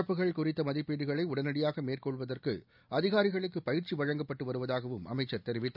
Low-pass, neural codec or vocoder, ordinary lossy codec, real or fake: 5.4 kHz; none; none; real